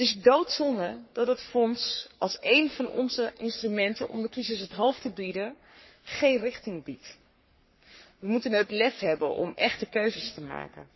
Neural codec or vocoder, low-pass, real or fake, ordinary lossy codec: codec, 44.1 kHz, 3.4 kbps, Pupu-Codec; 7.2 kHz; fake; MP3, 24 kbps